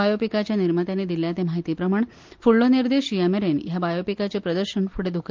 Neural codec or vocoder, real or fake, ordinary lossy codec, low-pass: none; real; Opus, 24 kbps; 7.2 kHz